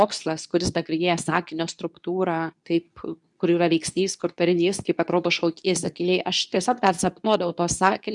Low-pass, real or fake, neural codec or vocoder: 10.8 kHz; fake; codec, 24 kHz, 0.9 kbps, WavTokenizer, medium speech release version 2